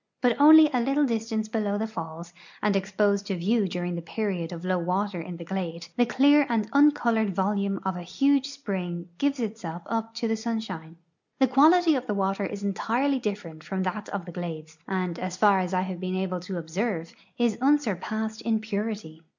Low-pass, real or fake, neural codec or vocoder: 7.2 kHz; real; none